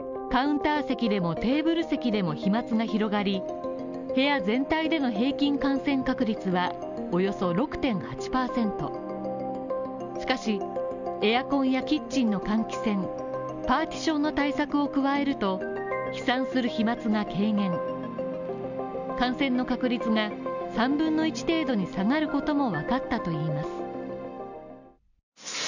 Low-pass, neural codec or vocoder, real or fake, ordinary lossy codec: 7.2 kHz; none; real; none